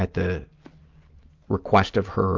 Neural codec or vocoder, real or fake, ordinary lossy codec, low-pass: none; real; Opus, 24 kbps; 7.2 kHz